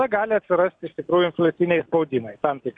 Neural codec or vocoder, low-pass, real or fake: none; 9.9 kHz; real